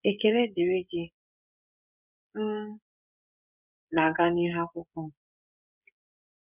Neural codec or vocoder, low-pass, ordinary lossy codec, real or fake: codec, 16 kHz, 16 kbps, FreqCodec, smaller model; 3.6 kHz; none; fake